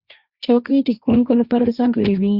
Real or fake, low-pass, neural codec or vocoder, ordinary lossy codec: fake; 5.4 kHz; codec, 16 kHz, 1.1 kbps, Voila-Tokenizer; Opus, 64 kbps